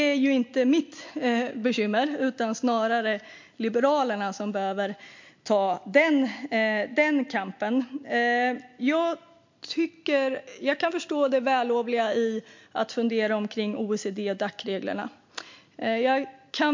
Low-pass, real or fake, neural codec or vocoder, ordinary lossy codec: 7.2 kHz; real; none; MP3, 48 kbps